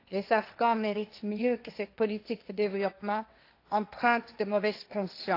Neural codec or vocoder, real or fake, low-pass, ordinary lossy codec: codec, 16 kHz, 1.1 kbps, Voila-Tokenizer; fake; 5.4 kHz; AAC, 32 kbps